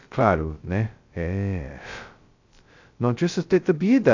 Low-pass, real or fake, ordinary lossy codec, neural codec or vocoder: 7.2 kHz; fake; AAC, 48 kbps; codec, 16 kHz, 0.2 kbps, FocalCodec